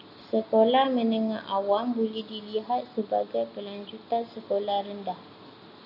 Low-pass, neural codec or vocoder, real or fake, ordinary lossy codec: 5.4 kHz; none; real; AAC, 48 kbps